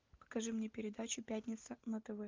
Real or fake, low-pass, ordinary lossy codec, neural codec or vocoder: real; 7.2 kHz; Opus, 24 kbps; none